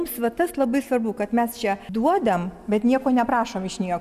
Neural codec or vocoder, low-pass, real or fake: none; 14.4 kHz; real